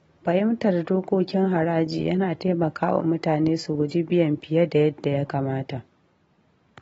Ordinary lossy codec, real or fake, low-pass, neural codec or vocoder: AAC, 24 kbps; real; 14.4 kHz; none